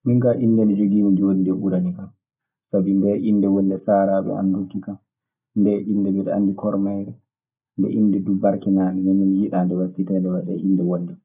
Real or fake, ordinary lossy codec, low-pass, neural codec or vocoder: real; none; 3.6 kHz; none